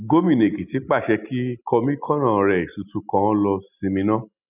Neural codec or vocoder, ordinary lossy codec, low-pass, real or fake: none; none; 3.6 kHz; real